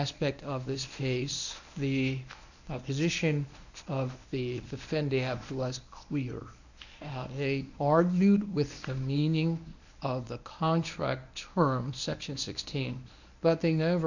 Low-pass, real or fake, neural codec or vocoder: 7.2 kHz; fake; codec, 24 kHz, 0.9 kbps, WavTokenizer, medium speech release version 1